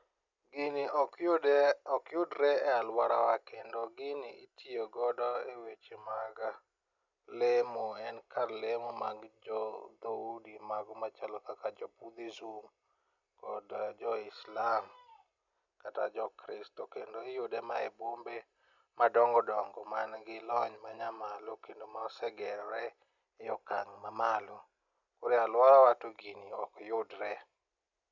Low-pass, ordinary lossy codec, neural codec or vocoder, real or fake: 7.2 kHz; none; none; real